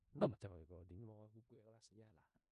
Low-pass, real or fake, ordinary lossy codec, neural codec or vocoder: 10.8 kHz; fake; none; codec, 16 kHz in and 24 kHz out, 0.4 kbps, LongCat-Audio-Codec, four codebook decoder